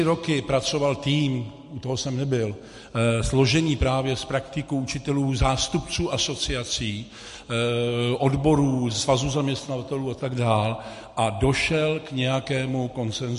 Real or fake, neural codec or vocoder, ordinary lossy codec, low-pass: real; none; MP3, 48 kbps; 14.4 kHz